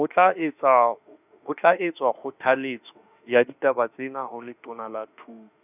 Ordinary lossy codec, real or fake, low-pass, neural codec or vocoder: none; fake; 3.6 kHz; autoencoder, 48 kHz, 32 numbers a frame, DAC-VAE, trained on Japanese speech